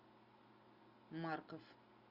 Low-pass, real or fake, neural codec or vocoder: 5.4 kHz; real; none